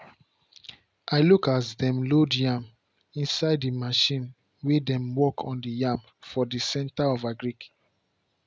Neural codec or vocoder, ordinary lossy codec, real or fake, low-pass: none; none; real; none